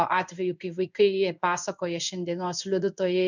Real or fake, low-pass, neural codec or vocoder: fake; 7.2 kHz; codec, 16 kHz in and 24 kHz out, 1 kbps, XY-Tokenizer